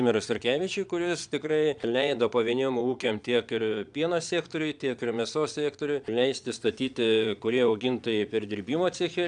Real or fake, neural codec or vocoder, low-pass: fake; vocoder, 22.05 kHz, 80 mel bands, Vocos; 9.9 kHz